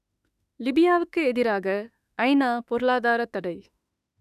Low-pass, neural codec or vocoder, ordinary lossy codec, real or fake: 14.4 kHz; autoencoder, 48 kHz, 32 numbers a frame, DAC-VAE, trained on Japanese speech; none; fake